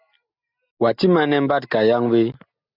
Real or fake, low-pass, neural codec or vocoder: real; 5.4 kHz; none